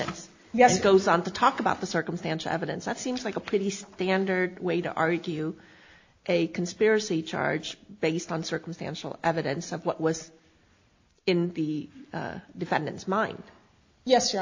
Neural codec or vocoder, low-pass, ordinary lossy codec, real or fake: none; 7.2 kHz; AAC, 48 kbps; real